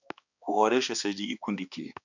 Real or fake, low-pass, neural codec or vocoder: fake; 7.2 kHz; codec, 16 kHz, 2 kbps, X-Codec, HuBERT features, trained on balanced general audio